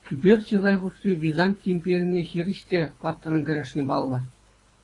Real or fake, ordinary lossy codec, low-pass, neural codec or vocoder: fake; AAC, 32 kbps; 10.8 kHz; codec, 24 kHz, 3 kbps, HILCodec